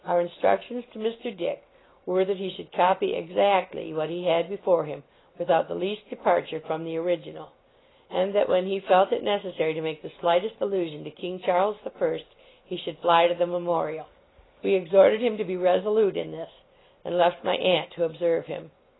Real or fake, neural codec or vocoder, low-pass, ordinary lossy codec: real; none; 7.2 kHz; AAC, 16 kbps